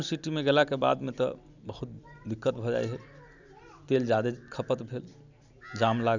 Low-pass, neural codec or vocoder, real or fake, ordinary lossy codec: 7.2 kHz; none; real; none